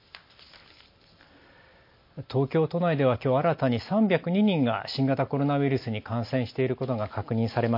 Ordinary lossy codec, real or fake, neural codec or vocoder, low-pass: MP3, 32 kbps; real; none; 5.4 kHz